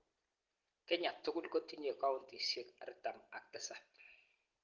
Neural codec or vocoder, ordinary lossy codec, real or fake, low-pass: none; Opus, 16 kbps; real; 7.2 kHz